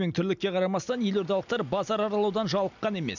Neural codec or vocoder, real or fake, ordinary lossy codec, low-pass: none; real; none; 7.2 kHz